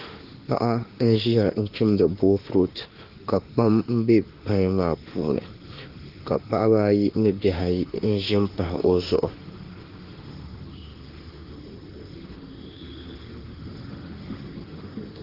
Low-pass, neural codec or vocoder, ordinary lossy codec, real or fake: 5.4 kHz; autoencoder, 48 kHz, 32 numbers a frame, DAC-VAE, trained on Japanese speech; Opus, 16 kbps; fake